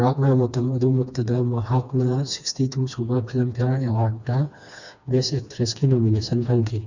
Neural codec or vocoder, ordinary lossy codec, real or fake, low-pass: codec, 16 kHz, 2 kbps, FreqCodec, smaller model; none; fake; 7.2 kHz